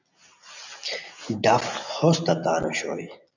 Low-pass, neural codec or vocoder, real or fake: 7.2 kHz; none; real